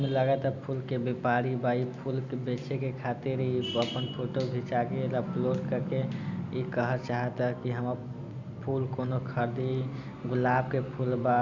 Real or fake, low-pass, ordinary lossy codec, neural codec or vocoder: real; 7.2 kHz; none; none